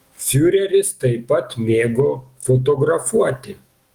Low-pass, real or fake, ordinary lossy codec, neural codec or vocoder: 19.8 kHz; fake; Opus, 24 kbps; autoencoder, 48 kHz, 128 numbers a frame, DAC-VAE, trained on Japanese speech